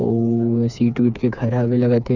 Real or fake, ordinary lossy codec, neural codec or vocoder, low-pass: fake; none; codec, 16 kHz, 4 kbps, FreqCodec, smaller model; 7.2 kHz